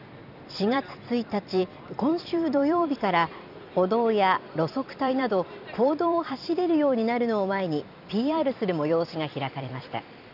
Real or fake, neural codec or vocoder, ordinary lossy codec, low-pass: fake; vocoder, 44.1 kHz, 128 mel bands every 256 samples, BigVGAN v2; none; 5.4 kHz